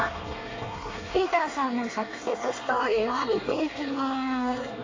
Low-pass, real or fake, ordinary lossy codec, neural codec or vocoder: 7.2 kHz; fake; AAC, 32 kbps; codec, 24 kHz, 1 kbps, SNAC